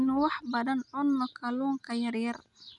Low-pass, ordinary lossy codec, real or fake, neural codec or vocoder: 10.8 kHz; none; real; none